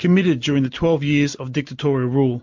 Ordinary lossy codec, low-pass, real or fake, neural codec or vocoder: MP3, 48 kbps; 7.2 kHz; real; none